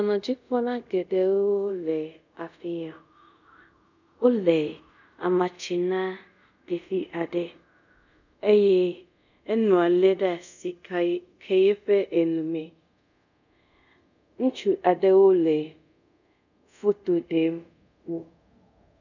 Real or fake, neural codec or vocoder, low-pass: fake; codec, 24 kHz, 0.5 kbps, DualCodec; 7.2 kHz